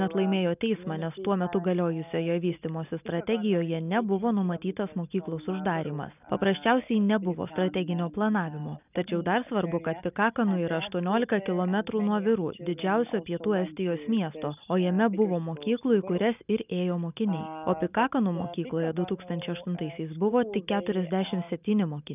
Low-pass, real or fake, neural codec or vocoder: 3.6 kHz; real; none